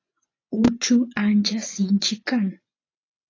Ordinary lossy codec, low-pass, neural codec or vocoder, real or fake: AAC, 32 kbps; 7.2 kHz; vocoder, 24 kHz, 100 mel bands, Vocos; fake